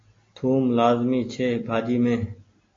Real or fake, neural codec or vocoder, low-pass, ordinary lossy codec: real; none; 7.2 kHz; AAC, 32 kbps